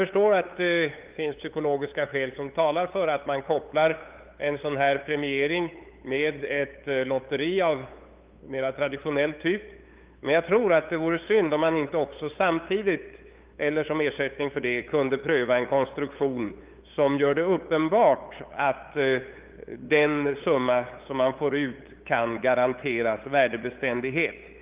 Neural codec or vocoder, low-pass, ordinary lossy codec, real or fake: codec, 16 kHz, 8 kbps, FunCodec, trained on LibriTTS, 25 frames a second; 3.6 kHz; Opus, 32 kbps; fake